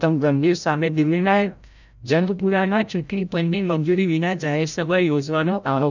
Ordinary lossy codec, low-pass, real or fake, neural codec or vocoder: none; 7.2 kHz; fake; codec, 16 kHz, 0.5 kbps, FreqCodec, larger model